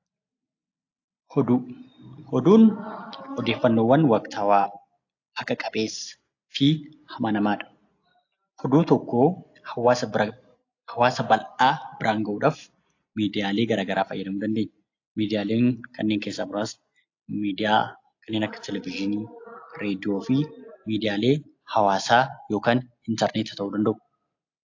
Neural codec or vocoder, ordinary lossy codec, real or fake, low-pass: none; AAC, 48 kbps; real; 7.2 kHz